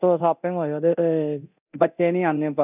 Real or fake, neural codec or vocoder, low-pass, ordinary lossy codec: fake; codec, 24 kHz, 0.9 kbps, DualCodec; 3.6 kHz; none